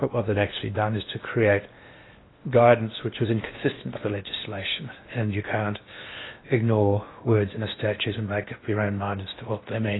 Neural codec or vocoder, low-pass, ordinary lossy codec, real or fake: codec, 16 kHz in and 24 kHz out, 0.8 kbps, FocalCodec, streaming, 65536 codes; 7.2 kHz; AAC, 16 kbps; fake